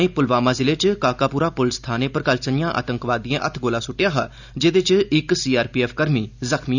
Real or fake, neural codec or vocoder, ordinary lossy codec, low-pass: real; none; none; 7.2 kHz